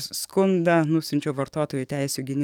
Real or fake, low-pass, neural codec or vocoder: fake; 19.8 kHz; codec, 44.1 kHz, 7.8 kbps, DAC